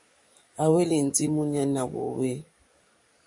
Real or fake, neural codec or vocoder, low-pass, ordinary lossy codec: fake; codec, 44.1 kHz, 7.8 kbps, DAC; 10.8 kHz; MP3, 48 kbps